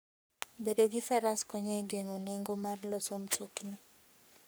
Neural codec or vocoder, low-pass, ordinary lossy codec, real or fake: codec, 44.1 kHz, 3.4 kbps, Pupu-Codec; none; none; fake